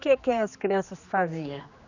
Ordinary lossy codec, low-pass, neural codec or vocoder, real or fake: none; 7.2 kHz; codec, 16 kHz, 2 kbps, X-Codec, HuBERT features, trained on general audio; fake